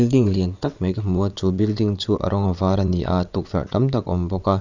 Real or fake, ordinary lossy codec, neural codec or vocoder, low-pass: fake; none; vocoder, 22.05 kHz, 80 mel bands, WaveNeXt; 7.2 kHz